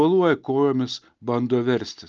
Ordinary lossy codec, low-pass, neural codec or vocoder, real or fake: Opus, 24 kbps; 7.2 kHz; none; real